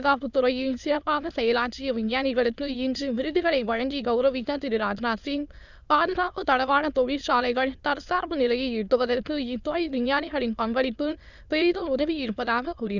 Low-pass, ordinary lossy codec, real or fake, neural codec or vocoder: 7.2 kHz; Opus, 64 kbps; fake; autoencoder, 22.05 kHz, a latent of 192 numbers a frame, VITS, trained on many speakers